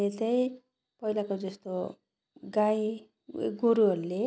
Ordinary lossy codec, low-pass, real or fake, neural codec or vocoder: none; none; real; none